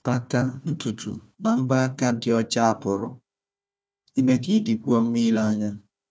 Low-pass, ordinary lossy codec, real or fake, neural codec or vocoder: none; none; fake; codec, 16 kHz, 1 kbps, FunCodec, trained on Chinese and English, 50 frames a second